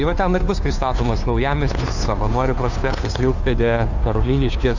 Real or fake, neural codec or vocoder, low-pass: fake; codec, 16 kHz, 2 kbps, FunCodec, trained on Chinese and English, 25 frames a second; 7.2 kHz